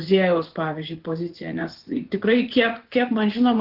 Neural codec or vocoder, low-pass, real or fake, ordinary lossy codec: vocoder, 24 kHz, 100 mel bands, Vocos; 5.4 kHz; fake; Opus, 16 kbps